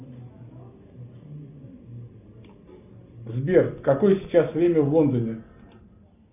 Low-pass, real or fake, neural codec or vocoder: 3.6 kHz; real; none